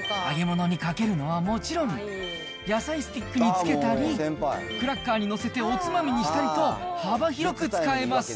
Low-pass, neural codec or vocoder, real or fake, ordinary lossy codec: none; none; real; none